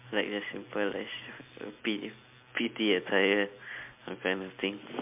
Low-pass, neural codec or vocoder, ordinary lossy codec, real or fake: 3.6 kHz; none; MP3, 32 kbps; real